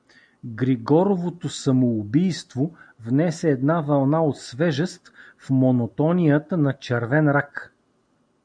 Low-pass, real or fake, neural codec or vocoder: 9.9 kHz; real; none